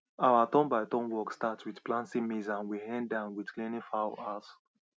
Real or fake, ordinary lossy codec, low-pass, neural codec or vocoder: real; none; none; none